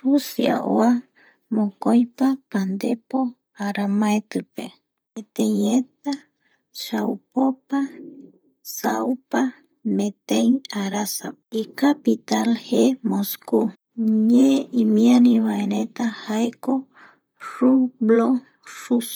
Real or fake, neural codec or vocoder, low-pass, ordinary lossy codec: fake; vocoder, 44.1 kHz, 128 mel bands, Pupu-Vocoder; none; none